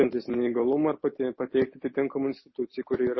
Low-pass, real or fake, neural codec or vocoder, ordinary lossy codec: 7.2 kHz; real; none; MP3, 24 kbps